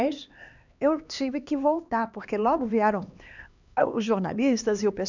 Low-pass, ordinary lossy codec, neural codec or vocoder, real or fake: 7.2 kHz; none; codec, 16 kHz, 2 kbps, X-Codec, HuBERT features, trained on LibriSpeech; fake